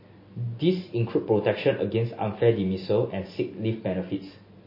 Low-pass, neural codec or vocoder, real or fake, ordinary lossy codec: 5.4 kHz; none; real; MP3, 24 kbps